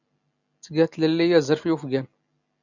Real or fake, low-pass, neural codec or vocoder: real; 7.2 kHz; none